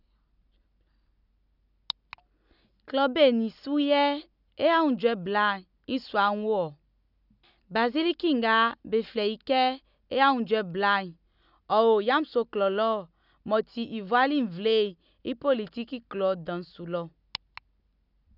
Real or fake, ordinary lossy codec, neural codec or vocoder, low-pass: real; none; none; 5.4 kHz